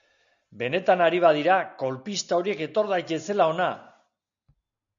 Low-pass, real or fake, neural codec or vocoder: 7.2 kHz; real; none